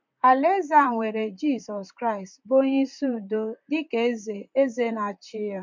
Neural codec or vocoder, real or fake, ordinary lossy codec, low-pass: vocoder, 44.1 kHz, 128 mel bands, Pupu-Vocoder; fake; MP3, 64 kbps; 7.2 kHz